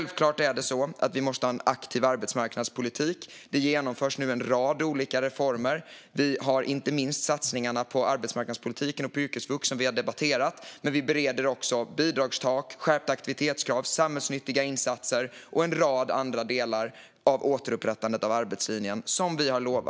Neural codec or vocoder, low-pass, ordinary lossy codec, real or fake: none; none; none; real